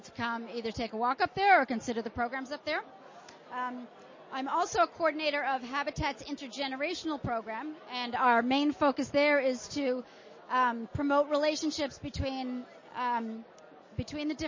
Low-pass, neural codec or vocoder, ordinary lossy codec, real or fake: 7.2 kHz; none; MP3, 32 kbps; real